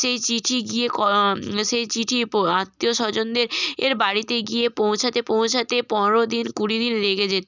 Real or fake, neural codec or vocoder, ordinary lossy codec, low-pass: real; none; none; 7.2 kHz